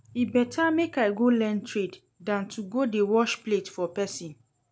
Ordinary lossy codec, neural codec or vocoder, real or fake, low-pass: none; none; real; none